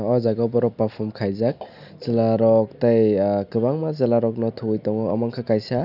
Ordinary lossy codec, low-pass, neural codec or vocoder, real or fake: none; 5.4 kHz; none; real